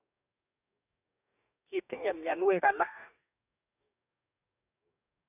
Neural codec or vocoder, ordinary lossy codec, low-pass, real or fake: codec, 44.1 kHz, 2.6 kbps, DAC; none; 3.6 kHz; fake